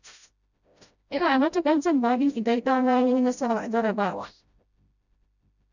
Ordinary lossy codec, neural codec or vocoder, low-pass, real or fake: none; codec, 16 kHz, 0.5 kbps, FreqCodec, smaller model; 7.2 kHz; fake